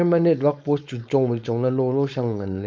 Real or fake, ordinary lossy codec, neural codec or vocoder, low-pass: fake; none; codec, 16 kHz, 4.8 kbps, FACodec; none